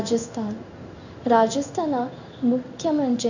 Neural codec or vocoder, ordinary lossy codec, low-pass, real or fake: codec, 16 kHz in and 24 kHz out, 1 kbps, XY-Tokenizer; none; 7.2 kHz; fake